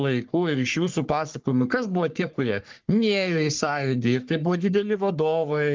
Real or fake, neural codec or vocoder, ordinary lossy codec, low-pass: fake; codec, 44.1 kHz, 3.4 kbps, Pupu-Codec; Opus, 24 kbps; 7.2 kHz